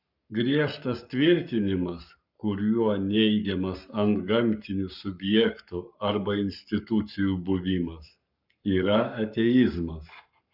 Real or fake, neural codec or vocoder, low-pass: fake; codec, 44.1 kHz, 7.8 kbps, Pupu-Codec; 5.4 kHz